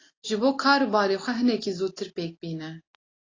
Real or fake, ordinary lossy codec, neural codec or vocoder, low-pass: real; AAC, 32 kbps; none; 7.2 kHz